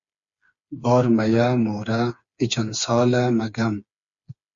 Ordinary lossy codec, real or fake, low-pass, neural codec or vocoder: Opus, 64 kbps; fake; 7.2 kHz; codec, 16 kHz, 4 kbps, FreqCodec, smaller model